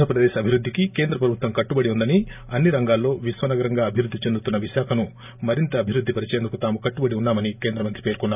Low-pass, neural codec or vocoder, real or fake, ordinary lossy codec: 3.6 kHz; none; real; none